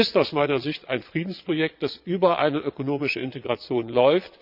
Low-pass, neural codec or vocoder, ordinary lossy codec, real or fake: 5.4 kHz; vocoder, 22.05 kHz, 80 mel bands, Vocos; Opus, 64 kbps; fake